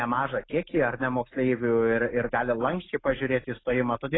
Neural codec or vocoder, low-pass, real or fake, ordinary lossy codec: none; 7.2 kHz; real; AAC, 16 kbps